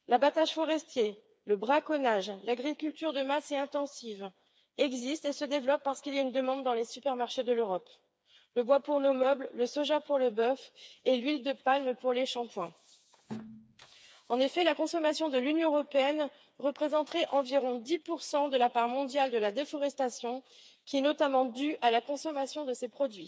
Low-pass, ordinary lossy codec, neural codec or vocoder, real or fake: none; none; codec, 16 kHz, 4 kbps, FreqCodec, smaller model; fake